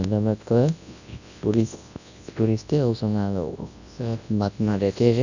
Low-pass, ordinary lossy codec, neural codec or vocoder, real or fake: 7.2 kHz; none; codec, 24 kHz, 0.9 kbps, WavTokenizer, large speech release; fake